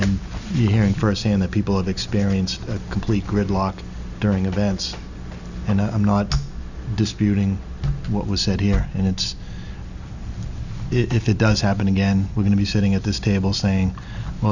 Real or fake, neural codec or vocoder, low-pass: real; none; 7.2 kHz